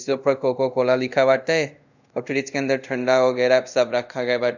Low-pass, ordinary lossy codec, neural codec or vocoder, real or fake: 7.2 kHz; none; codec, 24 kHz, 0.5 kbps, DualCodec; fake